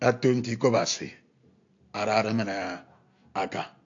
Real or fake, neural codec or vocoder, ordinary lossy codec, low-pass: real; none; AAC, 48 kbps; 7.2 kHz